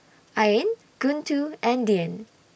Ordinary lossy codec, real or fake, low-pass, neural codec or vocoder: none; real; none; none